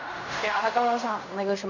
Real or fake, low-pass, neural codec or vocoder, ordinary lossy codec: fake; 7.2 kHz; codec, 16 kHz in and 24 kHz out, 0.4 kbps, LongCat-Audio-Codec, fine tuned four codebook decoder; none